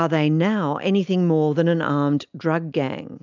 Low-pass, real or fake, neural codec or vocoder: 7.2 kHz; real; none